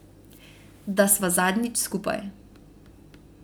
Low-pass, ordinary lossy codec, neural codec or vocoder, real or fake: none; none; none; real